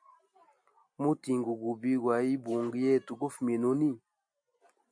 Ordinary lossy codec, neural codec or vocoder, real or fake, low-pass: AAC, 64 kbps; none; real; 9.9 kHz